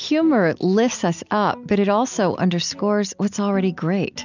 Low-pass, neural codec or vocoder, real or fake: 7.2 kHz; none; real